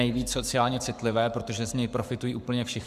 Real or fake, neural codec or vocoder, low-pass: fake; codec, 44.1 kHz, 7.8 kbps, Pupu-Codec; 14.4 kHz